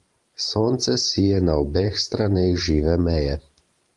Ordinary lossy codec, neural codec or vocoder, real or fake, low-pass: Opus, 32 kbps; vocoder, 44.1 kHz, 128 mel bands every 512 samples, BigVGAN v2; fake; 10.8 kHz